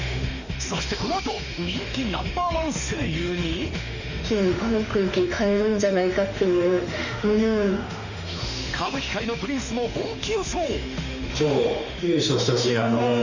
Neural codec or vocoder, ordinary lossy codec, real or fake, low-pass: autoencoder, 48 kHz, 32 numbers a frame, DAC-VAE, trained on Japanese speech; none; fake; 7.2 kHz